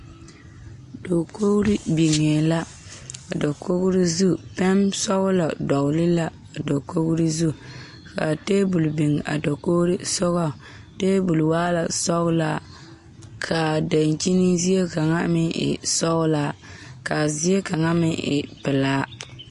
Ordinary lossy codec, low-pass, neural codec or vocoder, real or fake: MP3, 48 kbps; 14.4 kHz; none; real